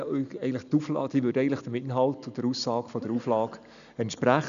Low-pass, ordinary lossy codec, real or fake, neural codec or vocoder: 7.2 kHz; none; real; none